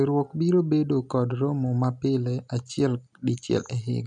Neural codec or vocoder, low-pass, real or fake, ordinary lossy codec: none; 10.8 kHz; real; none